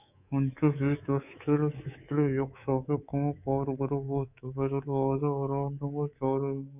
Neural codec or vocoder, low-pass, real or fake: codec, 24 kHz, 3.1 kbps, DualCodec; 3.6 kHz; fake